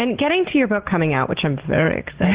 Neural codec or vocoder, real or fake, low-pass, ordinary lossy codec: none; real; 3.6 kHz; Opus, 16 kbps